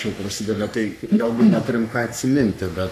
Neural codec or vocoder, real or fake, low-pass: codec, 44.1 kHz, 3.4 kbps, Pupu-Codec; fake; 14.4 kHz